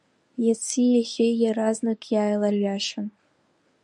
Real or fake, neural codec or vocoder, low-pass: fake; codec, 24 kHz, 0.9 kbps, WavTokenizer, medium speech release version 1; 10.8 kHz